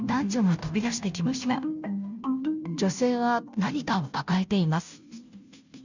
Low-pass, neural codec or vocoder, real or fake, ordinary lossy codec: 7.2 kHz; codec, 16 kHz, 0.5 kbps, FunCodec, trained on Chinese and English, 25 frames a second; fake; none